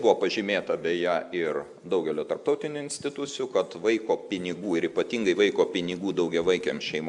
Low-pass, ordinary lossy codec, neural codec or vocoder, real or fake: 10.8 kHz; MP3, 96 kbps; none; real